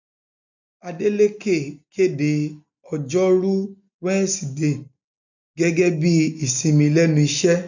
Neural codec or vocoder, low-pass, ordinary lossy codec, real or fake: none; 7.2 kHz; none; real